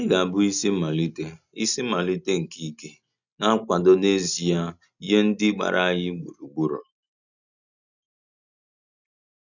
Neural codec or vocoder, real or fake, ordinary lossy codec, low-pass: none; real; none; 7.2 kHz